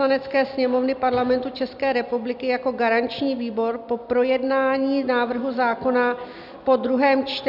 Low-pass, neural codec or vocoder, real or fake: 5.4 kHz; none; real